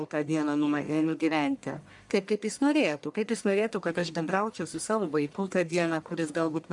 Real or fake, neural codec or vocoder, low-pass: fake; codec, 44.1 kHz, 1.7 kbps, Pupu-Codec; 10.8 kHz